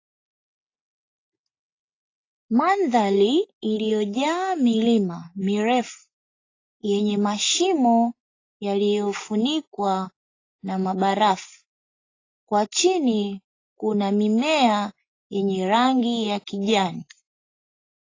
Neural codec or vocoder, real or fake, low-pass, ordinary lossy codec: none; real; 7.2 kHz; AAC, 32 kbps